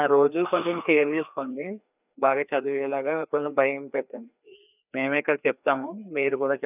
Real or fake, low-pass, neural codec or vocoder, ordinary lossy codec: fake; 3.6 kHz; codec, 16 kHz, 2 kbps, FreqCodec, larger model; none